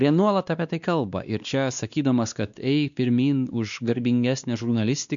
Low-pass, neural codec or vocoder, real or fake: 7.2 kHz; codec, 16 kHz, 2 kbps, X-Codec, WavLM features, trained on Multilingual LibriSpeech; fake